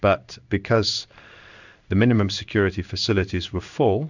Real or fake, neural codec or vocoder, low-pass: fake; codec, 16 kHz in and 24 kHz out, 1 kbps, XY-Tokenizer; 7.2 kHz